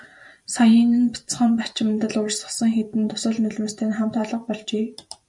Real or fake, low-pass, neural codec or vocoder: fake; 10.8 kHz; vocoder, 44.1 kHz, 128 mel bands every 512 samples, BigVGAN v2